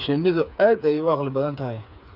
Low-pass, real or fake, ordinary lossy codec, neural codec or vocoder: 5.4 kHz; fake; none; codec, 16 kHz, 4 kbps, FreqCodec, smaller model